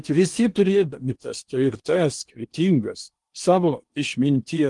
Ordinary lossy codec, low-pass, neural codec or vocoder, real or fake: Opus, 24 kbps; 10.8 kHz; codec, 16 kHz in and 24 kHz out, 0.8 kbps, FocalCodec, streaming, 65536 codes; fake